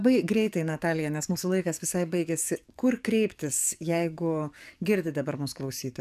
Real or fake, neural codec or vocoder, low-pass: fake; codec, 44.1 kHz, 7.8 kbps, DAC; 14.4 kHz